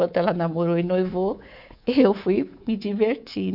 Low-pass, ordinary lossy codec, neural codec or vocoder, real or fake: 5.4 kHz; none; codec, 24 kHz, 3.1 kbps, DualCodec; fake